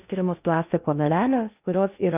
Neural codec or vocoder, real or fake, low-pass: codec, 16 kHz in and 24 kHz out, 0.6 kbps, FocalCodec, streaming, 2048 codes; fake; 3.6 kHz